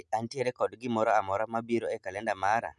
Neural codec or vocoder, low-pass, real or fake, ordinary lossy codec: none; none; real; none